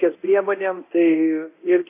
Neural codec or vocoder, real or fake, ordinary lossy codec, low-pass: codec, 24 kHz, 0.9 kbps, DualCodec; fake; AAC, 24 kbps; 3.6 kHz